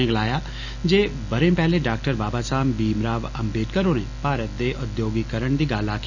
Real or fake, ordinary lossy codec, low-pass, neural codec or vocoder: real; none; 7.2 kHz; none